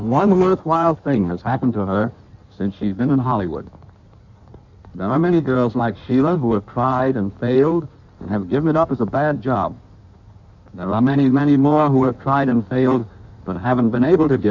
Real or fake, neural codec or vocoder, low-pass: fake; codec, 16 kHz in and 24 kHz out, 1.1 kbps, FireRedTTS-2 codec; 7.2 kHz